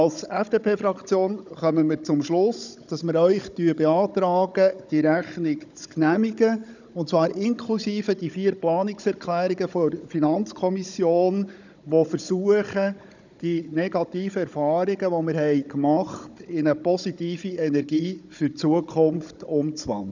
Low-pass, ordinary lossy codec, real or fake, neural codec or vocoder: 7.2 kHz; none; fake; codec, 16 kHz, 16 kbps, FunCodec, trained on Chinese and English, 50 frames a second